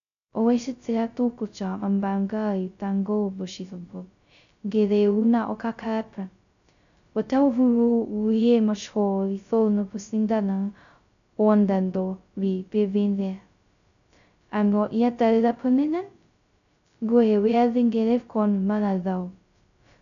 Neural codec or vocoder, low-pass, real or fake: codec, 16 kHz, 0.2 kbps, FocalCodec; 7.2 kHz; fake